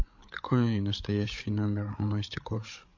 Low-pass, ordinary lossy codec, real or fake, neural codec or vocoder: 7.2 kHz; MP3, 48 kbps; fake; codec, 16 kHz, 8 kbps, FreqCodec, larger model